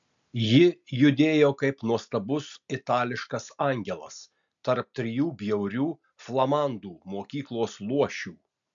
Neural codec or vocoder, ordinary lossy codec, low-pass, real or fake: none; MP3, 64 kbps; 7.2 kHz; real